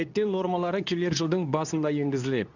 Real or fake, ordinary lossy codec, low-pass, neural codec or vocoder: fake; none; 7.2 kHz; codec, 24 kHz, 0.9 kbps, WavTokenizer, medium speech release version 2